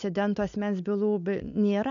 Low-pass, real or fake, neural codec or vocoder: 7.2 kHz; real; none